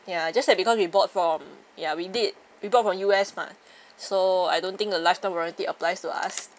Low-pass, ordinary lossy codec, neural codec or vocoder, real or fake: none; none; none; real